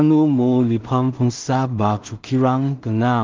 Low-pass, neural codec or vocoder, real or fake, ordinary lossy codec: 7.2 kHz; codec, 16 kHz in and 24 kHz out, 0.4 kbps, LongCat-Audio-Codec, two codebook decoder; fake; Opus, 32 kbps